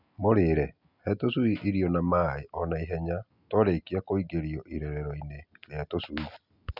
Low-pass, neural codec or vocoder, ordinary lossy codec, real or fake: 5.4 kHz; none; none; real